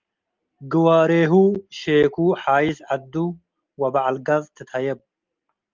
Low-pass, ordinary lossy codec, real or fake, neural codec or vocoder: 7.2 kHz; Opus, 24 kbps; real; none